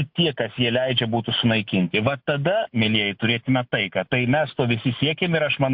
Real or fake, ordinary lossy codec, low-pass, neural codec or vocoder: real; MP3, 48 kbps; 5.4 kHz; none